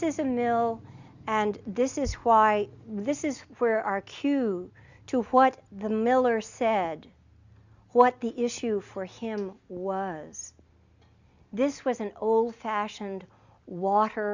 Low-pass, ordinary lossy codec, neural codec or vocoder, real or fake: 7.2 kHz; Opus, 64 kbps; none; real